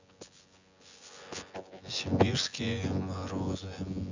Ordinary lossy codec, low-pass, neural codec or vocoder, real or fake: Opus, 64 kbps; 7.2 kHz; vocoder, 24 kHz, 100 mel bands, Vocos; fake